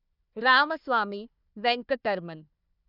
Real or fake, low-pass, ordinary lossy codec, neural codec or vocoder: fake; 5.4 kHz; none; codec, 16 kHz, 1 kbps, FunCodec, trained on Chinese and English, 50 frames a second